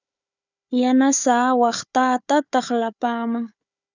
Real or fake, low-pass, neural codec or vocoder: fake; 7.2 kHz; codec, 16 kHz, 4 kbps, FunCodec, trained on Chinese and English, 50 frames a second